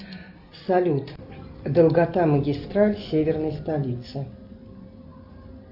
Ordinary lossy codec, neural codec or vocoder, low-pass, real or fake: Opus, 64 kbps; none; 5.4 kHz; real